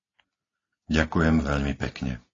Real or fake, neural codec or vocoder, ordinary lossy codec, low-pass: real; none; AAC, 32 kbps; 7.2 kHz